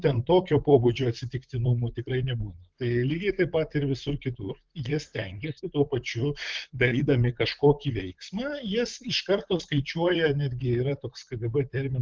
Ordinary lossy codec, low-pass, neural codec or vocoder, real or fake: Opus, 32 kbps; 7.2 kHz; vocoder, 44.1 kHz, 128 mel bands, Pupu-Vocoder; fake